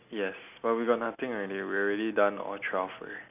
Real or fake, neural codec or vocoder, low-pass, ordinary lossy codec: real; none; 3.6 kHz; none